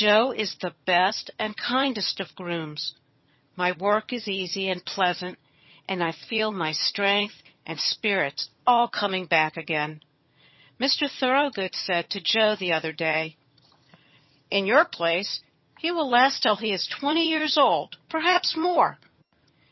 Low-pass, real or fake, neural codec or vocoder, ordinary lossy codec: 7.2 kHz; fake; vocoder, 22.05 kHz, 80 mel bands, HiFi-GAN; MP3, 24 kbps